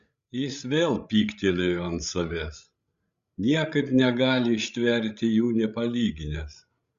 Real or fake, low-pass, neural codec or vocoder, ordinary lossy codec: fake; 7.2 kHz; codec, 16 kHz, 16 kbps, FreqCodec, larger model; Opus, 64 kbps